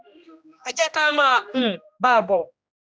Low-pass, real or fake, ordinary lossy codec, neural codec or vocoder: none; fake; none; codec, 16 kHz, 1 kbps, X-Codec, HuBERT features, trained on general audio